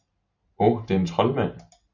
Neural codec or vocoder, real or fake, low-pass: none; real; 7.2 kHz